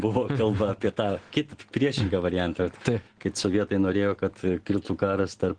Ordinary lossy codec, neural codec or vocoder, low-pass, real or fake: Opus, 16 kbps; none; 9.9 kHz; real